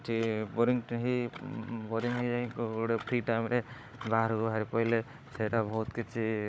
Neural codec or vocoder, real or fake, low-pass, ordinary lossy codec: codec, 16 kHz, 16 kbps, FunCodec, trained on Chinese and English, 50 frames a second; fake; none; none